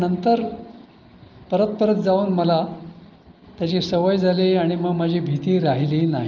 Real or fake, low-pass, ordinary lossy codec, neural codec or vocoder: real; 7.2 kHz; Opus, 32 kbps; none